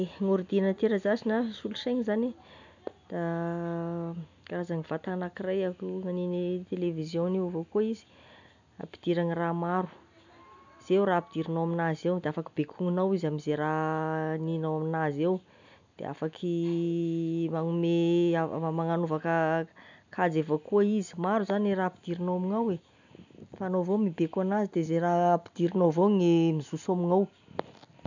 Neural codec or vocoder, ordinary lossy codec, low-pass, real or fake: none; none; 7.2 kHz; real